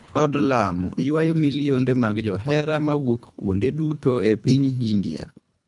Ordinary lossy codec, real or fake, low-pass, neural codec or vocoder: none; fake; 10.8 kHz; codec, 24 kHz, 1.5 kbps, HILCodec